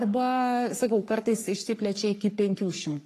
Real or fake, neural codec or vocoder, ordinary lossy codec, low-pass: fake; codec, 44.1 kHz, 3.4 kbps, Pupu-Codec; AAC, 48 kbps; 14.4 kHz